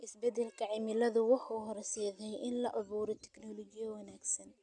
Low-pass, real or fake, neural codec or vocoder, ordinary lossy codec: 10.8 kHz; real; none; none